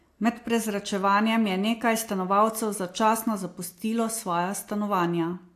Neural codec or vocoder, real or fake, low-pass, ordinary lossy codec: none; real; 14.4 kHz; AAC, 64 kbps